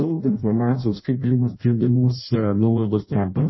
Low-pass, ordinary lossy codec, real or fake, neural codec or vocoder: 7.2 kHz; MP3, 24 kbps; fake; codec, 16 kHz in and 24 kHz out, 0.6 kbps, FireRedTTS-2 codec